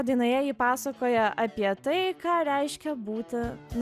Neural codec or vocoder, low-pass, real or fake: none; 14.4 kHz; real